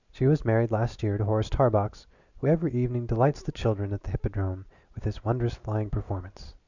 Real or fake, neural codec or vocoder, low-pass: real; none; 7.2 kHz